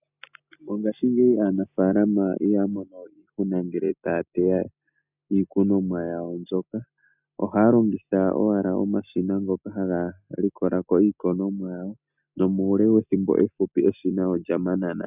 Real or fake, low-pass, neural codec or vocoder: real; 3.6 kHz; none